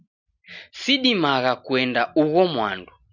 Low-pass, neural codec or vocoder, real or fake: 7.2 kHz; none; real